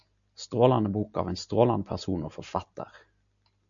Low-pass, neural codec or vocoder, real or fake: 7.2 kHz; none; real